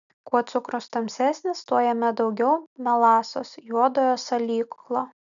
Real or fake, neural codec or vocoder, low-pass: real; none; 7.2 kHz